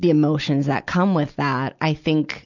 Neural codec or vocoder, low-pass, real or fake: none; 7.2 kHz; real